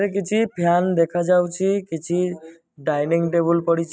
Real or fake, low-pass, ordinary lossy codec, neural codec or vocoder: real; none; none; none